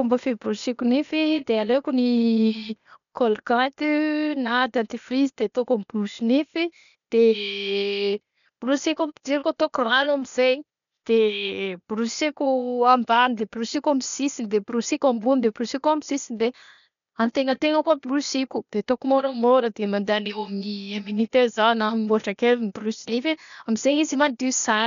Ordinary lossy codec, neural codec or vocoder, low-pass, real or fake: none; codec, 16 kHz, 0.8 kbps, ZipCodec; 7.2 kHz; fake